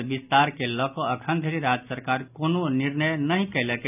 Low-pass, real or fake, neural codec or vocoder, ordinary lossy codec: 3.6 kHz; real; none; none